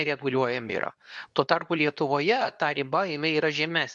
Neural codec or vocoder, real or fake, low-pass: codec, 24 kHz, 0.9 kbps, WavTokenizer, medium speech release version 2; fake; 10.8 kHz